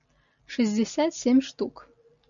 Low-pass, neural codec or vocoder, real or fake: 7.2 kHz; none; real